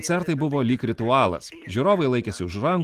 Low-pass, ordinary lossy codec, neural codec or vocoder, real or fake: 14.4 kHz; Opus, 24 kbps; none; real